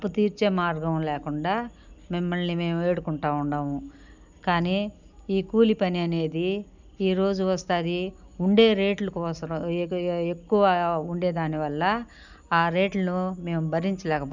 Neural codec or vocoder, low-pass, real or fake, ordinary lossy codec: none; 7.2 kHz; real; none